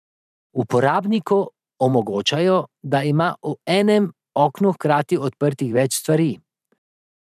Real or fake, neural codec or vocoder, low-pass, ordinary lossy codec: fake; vocoder, 44.1 kHz, 128 mel bands every 512 samples, BigVGAN v2; 14.4 kHz; none